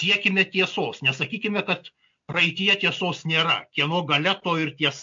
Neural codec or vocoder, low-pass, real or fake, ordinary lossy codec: none; 7.2 kHz; real; MP3, 48 kbps